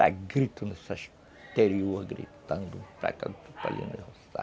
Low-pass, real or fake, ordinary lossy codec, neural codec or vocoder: none; real; none; none